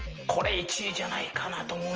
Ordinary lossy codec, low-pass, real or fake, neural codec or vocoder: Opus, 24 kbps; 7.2 kHz; real; none